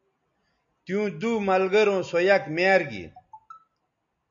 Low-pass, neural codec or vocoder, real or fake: 7.2 kHz; none; real